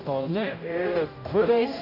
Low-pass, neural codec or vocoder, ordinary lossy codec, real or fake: 5.4 kHz; codec, 16 kHz, 0.5 kbps, X-Codec, HuBERT features, trained on general audio; none; fake